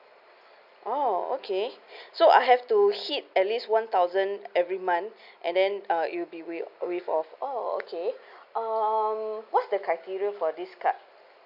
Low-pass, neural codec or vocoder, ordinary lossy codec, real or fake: 5.4 kHz; none; AAC, 48 kbps; real